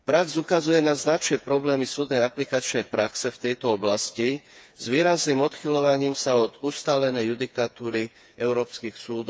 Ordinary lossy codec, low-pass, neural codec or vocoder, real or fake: none; none; codec, 16 kHz, 4 kbps, FreqCodec, smaller model; fake